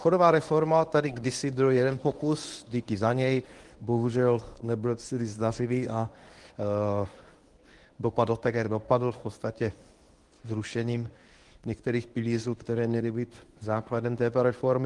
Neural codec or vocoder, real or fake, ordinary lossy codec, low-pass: codec, 24 kHz, 0.9 kbps, WavTokenizer, medium speech release version 1; fake; Opus, 24 kbps; 10.8 kHz